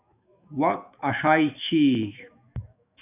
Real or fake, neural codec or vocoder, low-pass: fake; autoencoder, 48 kHz, 128 numbers a frame, DAC-VAE, trained on Japanese speech; 3.6 kHz